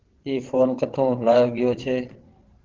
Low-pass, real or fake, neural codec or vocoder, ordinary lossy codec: 7.2 kHz; fake; vocoder, 22.05 kHz, 80 mel bands, WaveNeXt; Opus, 16 kbps